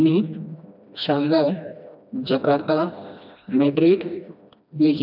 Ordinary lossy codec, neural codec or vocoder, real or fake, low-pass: none; codec, 16 kHz, 1 kbps, FreqCodec, smaller model; fake; 5.4 kHz